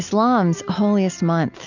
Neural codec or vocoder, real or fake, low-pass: none; real; 7.2 kHz